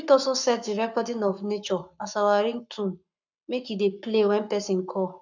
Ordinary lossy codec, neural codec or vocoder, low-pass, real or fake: none; codec, 44.1 kHz, 7.8 kbps, Pupu-Codec; 7.2 kHz; fake